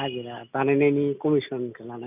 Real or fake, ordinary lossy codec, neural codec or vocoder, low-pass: real; none; none; 3.6 kHz